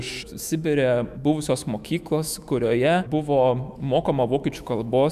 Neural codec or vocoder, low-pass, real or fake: autoencoder, 48 kHz, 128 numbers a frame, DAC-VAE, trained on Japanese speech; 14.4 kHz; fake